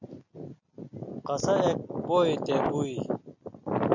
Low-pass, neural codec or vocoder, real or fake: 7.2 kHz; none; real